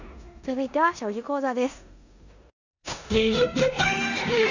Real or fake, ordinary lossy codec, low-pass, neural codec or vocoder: fake; none; 7.2 kHz; codec, 16 kHz in and 24 kHz out, 0.9 kbps, LongCat-Audio-Codec, four codebook decoder